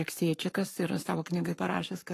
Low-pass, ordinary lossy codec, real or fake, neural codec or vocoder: 14.4 kHz; AAC, 48 kbps; fake; codec, 44.1 kHz, 7.8 kbps, Pupu-Codec